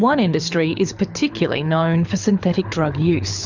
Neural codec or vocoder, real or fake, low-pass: codec, 24 kHz, 6 kbps, HILCodec; fake; 7.2 kHz